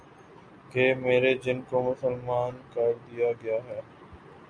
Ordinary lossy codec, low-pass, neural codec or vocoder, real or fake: MP3, 64 kbps; 9.9 kHz; none; real